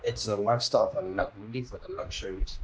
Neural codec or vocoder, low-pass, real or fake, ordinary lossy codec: codec, 16 kHz, 1 kbps, X-Codec, HuBERT features, trained on general audio; none; fake; none